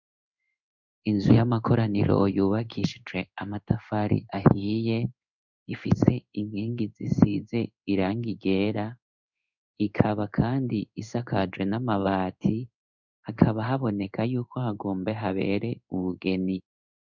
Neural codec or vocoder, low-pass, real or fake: codec, 16 kHz in and 24 kHz out, 1 kbps, XY-Tokenizer; 7.2 kHz; fake